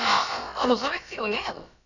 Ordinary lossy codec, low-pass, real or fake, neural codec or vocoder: none; 7.2 kHz; fake; codec, 16 kHz, about 1 kbps, DyCAST, with the encoder's durations